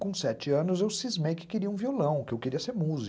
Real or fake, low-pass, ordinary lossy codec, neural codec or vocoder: real; none; none; none